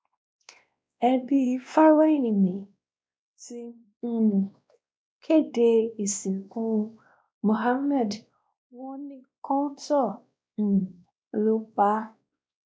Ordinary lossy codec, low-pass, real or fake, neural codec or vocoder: none; none; fake; codec, 16 kHz, 1 kbps, X-Codec, WavLM features, trained on Multilingual LibriSpeech